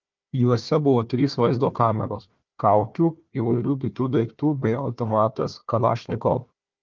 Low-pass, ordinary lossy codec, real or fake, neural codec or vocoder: 7.2 kHz; Opus, 24 kbps; fake; codec, 16 kHz, 1 kbps, FunCodec, trained on Chinese and English, 50 frames a second